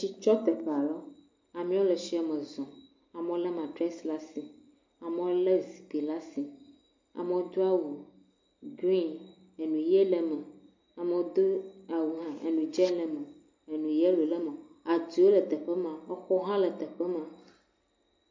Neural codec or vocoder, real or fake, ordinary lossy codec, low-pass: none; real; MP3, 48 kbps; 7.2 kHz